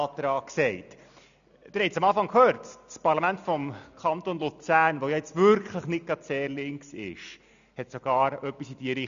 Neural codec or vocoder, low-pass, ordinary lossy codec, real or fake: none; 7.2 kHz; MP3, 96 kbps; real